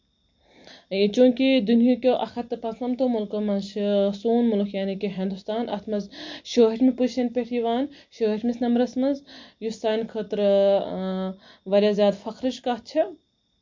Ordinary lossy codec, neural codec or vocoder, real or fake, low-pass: MP3, 48 kbps; none; real; 7.2 kHz